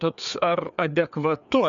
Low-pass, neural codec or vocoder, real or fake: 7.2 kHz; codec, 16 kHz, 4 kbps, FreqCodec, larger model; fake